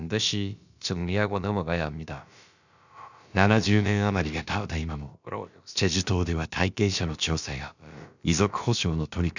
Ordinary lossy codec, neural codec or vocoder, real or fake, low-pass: none; codec, 16 kHz, about 1 kbps, DyCAST, with the encoder's durations; fake; 7.2 kHz